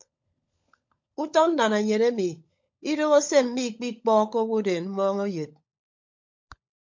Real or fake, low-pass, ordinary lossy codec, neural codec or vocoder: fake; 7.2 kHz; MP3, 48 kbps; codec, 16 kHz, 16 kbps, FunCodec, trained on LibriTTS, 50 frames a second